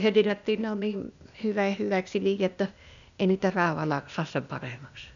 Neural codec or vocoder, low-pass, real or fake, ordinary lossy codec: codec, 16 kHz, 0.8 kbps, ZipCodec; 7.2 kHz; fake; none